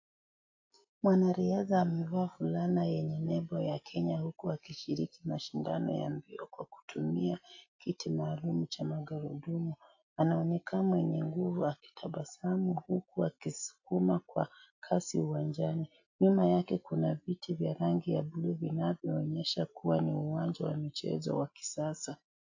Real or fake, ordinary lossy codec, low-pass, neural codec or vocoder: real; AAC, 48 kbps; 7.2 kHz; none